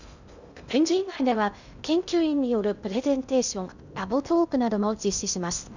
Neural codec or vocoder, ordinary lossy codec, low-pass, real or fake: codec, 16 kHz in and 24 kHz out, 0.6 kbps, FocalCodec, streaming, 2048 codes; none; 7.2 kHz; fake